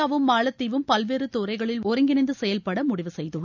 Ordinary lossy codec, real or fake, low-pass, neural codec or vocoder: none; real; 7.2 kHz; none